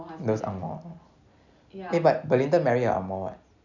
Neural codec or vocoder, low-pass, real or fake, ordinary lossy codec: none; 7.2 kHz; real; none